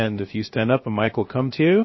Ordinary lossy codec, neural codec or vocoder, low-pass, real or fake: MP3, 24 kbps; codec, 16 kHz, 0.7 kbps, FocalCodec; 7.2 kHz; fake